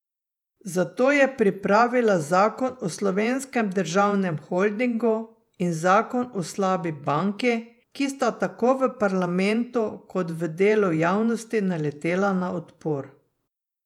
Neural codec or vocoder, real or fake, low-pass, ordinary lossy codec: vocoder, 48 kHz, 128 mel bands, Vocos; fake; 19.8 kHz; none